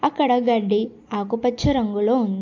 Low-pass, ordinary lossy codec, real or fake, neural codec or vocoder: 7.2 kHz; AAC, 48 kbps; real; none